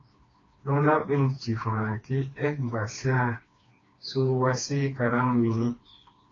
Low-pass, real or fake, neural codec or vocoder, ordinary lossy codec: 7.2 kHz; fake; codec, 16 kHz, 2 kbps, FreqCodec, smaller model; AAC, 32 kbps